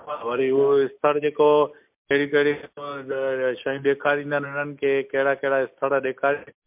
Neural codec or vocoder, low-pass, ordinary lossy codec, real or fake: none; 3.6 kHz; MP3, 32 kbps; real